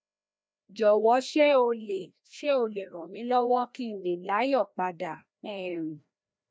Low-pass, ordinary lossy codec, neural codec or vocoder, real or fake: none; none; codec, 16 kHz, 1 kbps, FreqCodec, larger model; fake